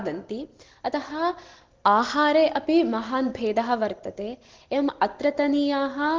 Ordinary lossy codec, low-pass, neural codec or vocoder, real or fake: Opus, 16 kbps; 7.2 kHz; none; real